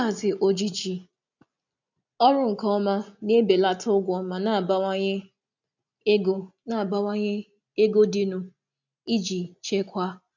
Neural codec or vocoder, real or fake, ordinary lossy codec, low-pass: none; real; none; 7.2 kHz